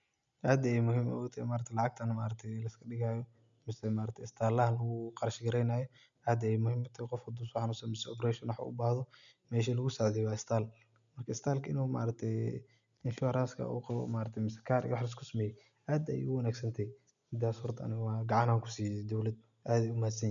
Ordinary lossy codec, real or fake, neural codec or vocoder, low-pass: none; real; none; 7.2 kHz